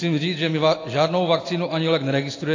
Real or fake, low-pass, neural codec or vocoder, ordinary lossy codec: real; 7.2 kHz; none; AAC, 32 kbps